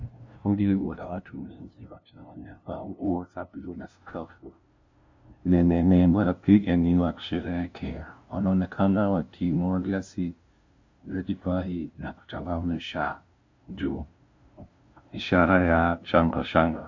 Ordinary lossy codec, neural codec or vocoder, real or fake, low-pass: MP3, 48 kbps; codec, 16 kHz, 0.5 kbps, FunCodec, trained on LibriTTS, 25 frames a second; fake; 7.2 kHz